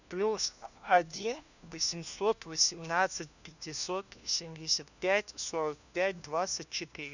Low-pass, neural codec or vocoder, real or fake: 7.2 kHz; codec, 16 kHz, 1 kbps, FunCodec, trained on LibriTTS, 50 frames a second; fake